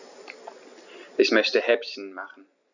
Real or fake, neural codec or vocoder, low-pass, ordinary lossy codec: real; none; 7.2 kHz; none